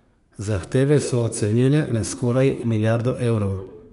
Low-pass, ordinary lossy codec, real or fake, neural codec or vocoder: 10.8 kHz; none; fake; codec, 24 kHz, 1 kbps, SNAC